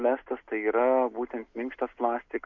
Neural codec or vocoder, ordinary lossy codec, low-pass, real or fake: none; MP3, 48 kbps; 7.2 kHz; real